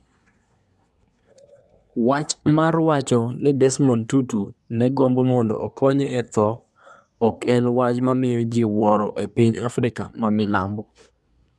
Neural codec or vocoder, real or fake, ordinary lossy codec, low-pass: codec, 24 kHz, 1 kbps, SNAC; fake; none; none